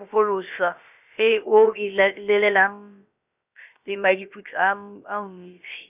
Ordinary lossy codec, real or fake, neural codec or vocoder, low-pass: none; fake; codec, 16 kHz, about 1 kbps, DyCAST, with the encoder's durations; 3.6 kHz